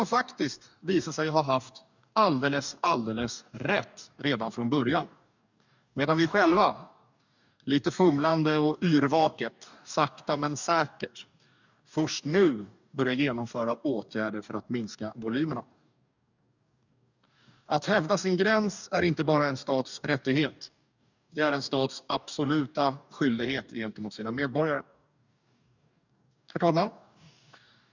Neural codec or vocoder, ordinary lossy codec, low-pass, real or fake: codec, 44.1 kHz, 2.6 kbps, DAC; none; 7.2 kHz; fake